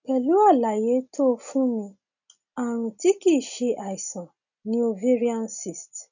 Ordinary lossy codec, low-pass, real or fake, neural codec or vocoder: none; 7.2 kHz; real; none